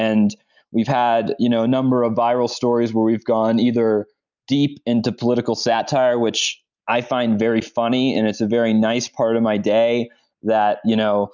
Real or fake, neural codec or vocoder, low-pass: real; none; 7.2 kHz